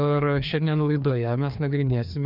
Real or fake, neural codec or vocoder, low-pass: fake; codec, 16 kHz, 2 kbps, FreqCodec, larger model; 5.4 kHz